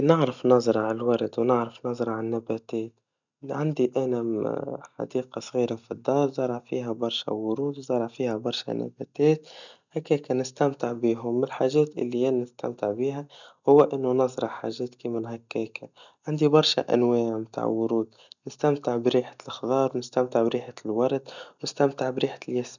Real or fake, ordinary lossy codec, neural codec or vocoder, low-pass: real; none; none; 7.2 kHz